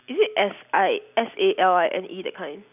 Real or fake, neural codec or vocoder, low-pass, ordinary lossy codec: real; none; 3.6 kHz; none